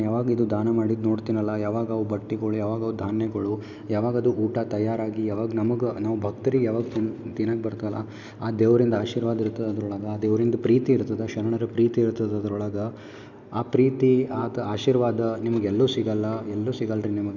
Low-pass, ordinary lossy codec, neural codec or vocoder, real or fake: 7.2 kHz; none; none; real